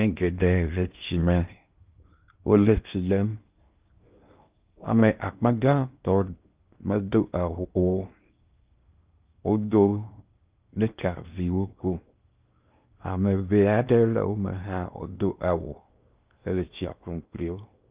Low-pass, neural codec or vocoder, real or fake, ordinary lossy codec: 3.6 kHz; codec, 16 kHz in and 24 kHz out, 0.6 kbps, FocalCodec, streaming, 4096 codes; fake; Opus, 32 kbps